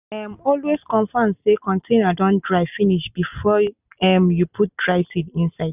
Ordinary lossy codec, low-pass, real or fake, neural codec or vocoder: none; 3.6 kHz; real; none